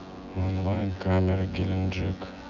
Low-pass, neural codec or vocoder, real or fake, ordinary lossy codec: 7.2 kHz; vocoder, 24 kHz, 100 mel bands, Vocos; fake; none